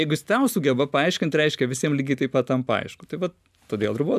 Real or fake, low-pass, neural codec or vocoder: real; 14.4 kHz; none